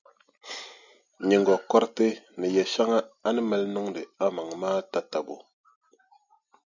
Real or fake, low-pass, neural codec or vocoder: real; 7.2 kHz; none